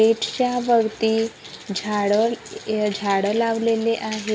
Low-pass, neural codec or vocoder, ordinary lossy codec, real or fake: none; none; none; real